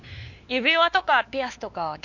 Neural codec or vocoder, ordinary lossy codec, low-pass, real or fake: codec, 16 kHz, 2 kbps, X-Codec, WavLM features, trained on Multilingual LibriSpeech; none; 7.2 kHz; fake